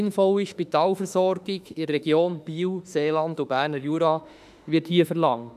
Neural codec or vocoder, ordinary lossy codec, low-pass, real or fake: autoencoder, 48 kHz, 32 numbers a frame, DAC-VAE, trained on Japanese speech; none; 14.4 kHz; fake